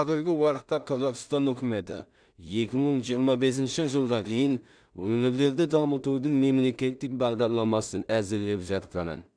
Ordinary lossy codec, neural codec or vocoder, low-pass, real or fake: none; codec, 16 kHz in and 24 kHz out, 0.4 kbps, LongCat-Audio-Codec, two codebook decoder; 9.9 kHz; fake